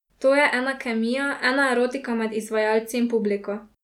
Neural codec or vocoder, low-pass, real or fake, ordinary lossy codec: none; 19.8 kHz; real; none